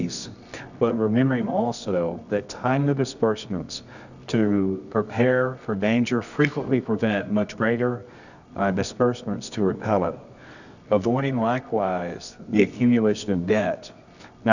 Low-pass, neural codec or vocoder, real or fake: 7.2 kHz; codec, 24 kHz, 0.9 kbps, WavTokenizer, medium music audio release; fake